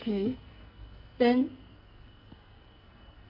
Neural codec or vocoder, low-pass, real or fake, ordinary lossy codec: codec, 44.1 kHz, 2.6 kbps, SNAC; 5.4 kHz; fake; none